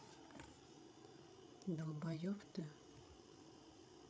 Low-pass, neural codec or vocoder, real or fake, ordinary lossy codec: none; codec, 16 kHz, 8 kbps, FreqCodec, larger model; fake; none